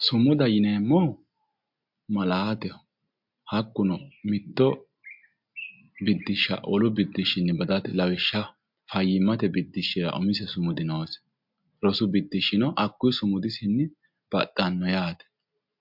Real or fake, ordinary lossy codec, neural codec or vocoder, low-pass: real; MP3, 48 kbps; none; 5.4 kHz